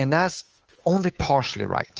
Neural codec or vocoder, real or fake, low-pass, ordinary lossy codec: codec, 16 kHz, 2 kbps, FunCodec, trained on Chinese and English, 25 frames a second; fake; 7.2 kHz; Opus, 24 kbps